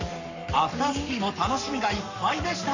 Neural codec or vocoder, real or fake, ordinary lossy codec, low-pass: codec, 44.1 kHz, 7.8 kbps, Pupu-Codec; fake; none; 7.2 kHz